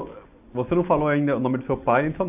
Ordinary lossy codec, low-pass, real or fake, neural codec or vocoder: AAC, 32 kbps; 3.6 kHz; real; none